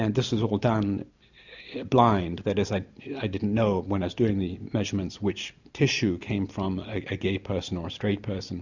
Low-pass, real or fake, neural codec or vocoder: 7.2 kHz; real; none